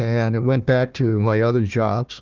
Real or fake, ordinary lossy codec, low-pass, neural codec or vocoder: fake; Opus, 32 kbps; 7.2 kHz; codec, 16 kHz, 1 kbps, FunCodec, trained on Chinese and English, 50 frames a second